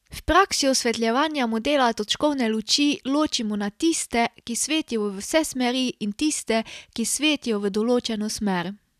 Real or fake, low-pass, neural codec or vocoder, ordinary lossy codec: real; 14.4 kHz; none; none